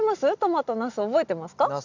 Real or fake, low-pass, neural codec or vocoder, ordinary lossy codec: real; 7.2 kHz; none; none